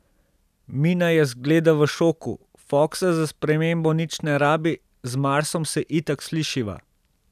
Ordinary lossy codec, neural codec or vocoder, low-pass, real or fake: none; none; 14.4 kHz; real